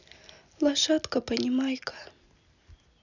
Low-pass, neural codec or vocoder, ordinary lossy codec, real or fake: 7.2 kHz; none; none; real